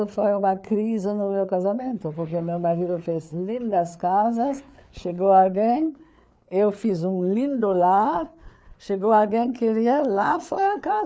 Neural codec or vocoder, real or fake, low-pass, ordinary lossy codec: codec, 16 kHz, 4 kbps, FreqCodec, larger model; fake; none; none